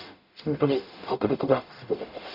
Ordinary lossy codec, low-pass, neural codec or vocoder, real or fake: none; 5.4 kHz; codec, 44.1 kHz, 0.9 kbps, DAC; fake